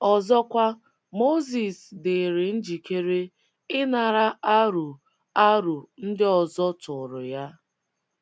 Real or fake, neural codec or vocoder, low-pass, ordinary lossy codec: real; none; none; none